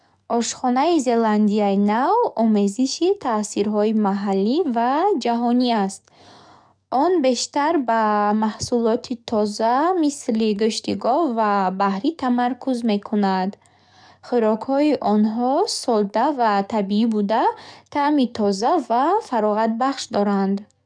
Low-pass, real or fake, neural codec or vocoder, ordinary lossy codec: 9.9 kHz; fake; codec, 44.1 kHz, 7.8 kbps, DAC; none